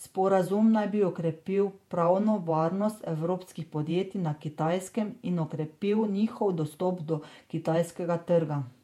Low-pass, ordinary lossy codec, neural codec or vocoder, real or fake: 19.8 kHz; MP3, 64 kbps; vocoder, 48 kHz, 128 mel bands, Vocos; fake